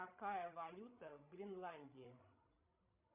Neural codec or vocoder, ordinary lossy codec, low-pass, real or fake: codec, 16 kHz, 16 kbps, FreqCodec, larger model; MP3, 32 kbps; 3.6 kHz; fake